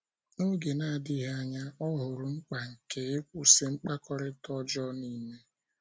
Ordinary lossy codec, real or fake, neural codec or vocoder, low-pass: none; real; none; none